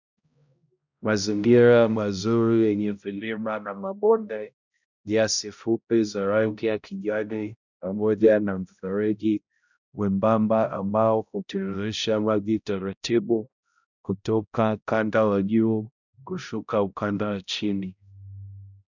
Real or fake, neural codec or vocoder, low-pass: fake; codec, 16 kHz, 0.5 kbps, X-Codec, HuBERT features, trained on balanced general audio; 7.2 kHz